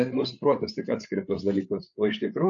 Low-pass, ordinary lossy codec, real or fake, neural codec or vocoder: 7.2 kHz; AAC, 64 kbps; fake; codec, 16 kHz, 8 kbps, FreqCodec, larger model